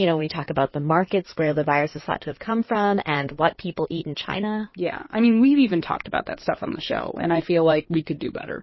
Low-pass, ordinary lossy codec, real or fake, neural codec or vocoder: 7.2 kHz; MP3, 24 kbps; fake; codec, 16 kHz in and 24 kHz out, 2.2 kbps, FireRedTTS-2 codec